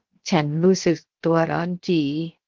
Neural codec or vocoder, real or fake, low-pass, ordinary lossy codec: codec, 16 kHz, 0.7 kbps, FocalCodec; fake; 7.2 kHz; Opus, 16 kbps